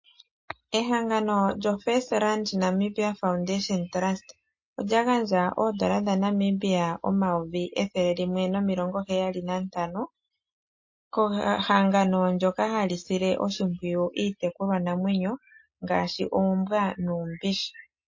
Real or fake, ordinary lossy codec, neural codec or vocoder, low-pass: real; MP3, 32 kbps; none; 7.2 kHz